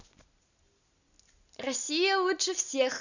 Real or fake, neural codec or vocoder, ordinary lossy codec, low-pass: real; none; none; 7.2 kHz